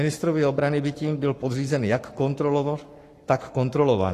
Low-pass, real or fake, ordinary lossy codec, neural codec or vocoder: 14.4 kHz; fake; AAC, 48 kbps; codec, 44.1 kHz, 7.8 kbps, Pupu-Codec